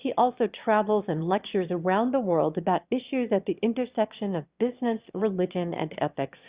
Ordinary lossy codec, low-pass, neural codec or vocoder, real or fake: Opus, 64 kbps; 3.6 kHz; autoencoder, 22.05 kHz, a latent of 192 numbers a frame, VITS, trained on one speaker; fake